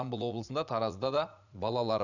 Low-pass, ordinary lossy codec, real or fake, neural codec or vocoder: 7.2 kHz; none; fake; vocoder, 44.1 kHz, 80 mel bands, Vocos